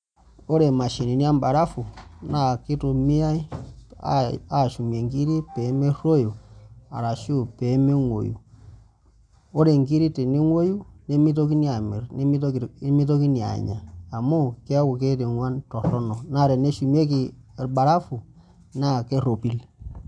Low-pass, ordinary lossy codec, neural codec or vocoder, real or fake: 9.9 kHz; none; none; real